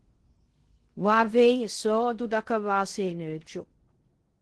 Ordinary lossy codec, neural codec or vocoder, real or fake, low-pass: Opus, 16 kbps; codec, 16 kHz in and 24 kHz out, 0.6 kbps, FocalCodec, streaming, 2048 codes; fake; 10.8 kHz